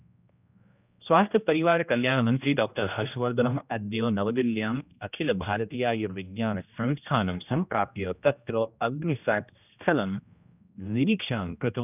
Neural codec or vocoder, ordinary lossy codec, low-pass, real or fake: codec, 16 kHz, 1 kbps, X-Codec, HuBERT features, trained on general audio; none; 3.6 kHz; fake